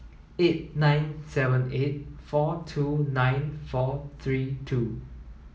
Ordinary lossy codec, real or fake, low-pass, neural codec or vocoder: none; real; none; none